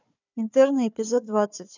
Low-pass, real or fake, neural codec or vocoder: 7.2 kHz; fake; codec, 16 kHz, 4 kbps, FunCodec, trained on Chinese and English, 50 frames a second